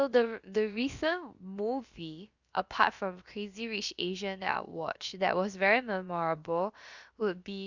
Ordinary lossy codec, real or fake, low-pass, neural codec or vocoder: Opus, 64 kbps; fake; 7.2 kHz; codec, 16 kHz, about 1 kbps, DyCAST, with the encoder's durations